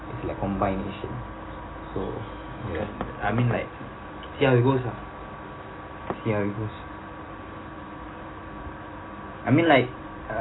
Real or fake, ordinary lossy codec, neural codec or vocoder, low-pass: real; AAC, 16 kbps; none; 7.2 kHz